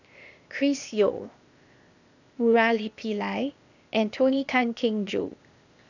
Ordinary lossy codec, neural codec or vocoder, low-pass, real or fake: none; codec, 16 kHz, 0.8 kbps, ZipCodec; 7.2 kHz; fake